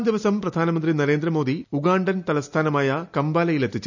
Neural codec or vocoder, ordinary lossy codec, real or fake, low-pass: none; none; real; 7.2 kHz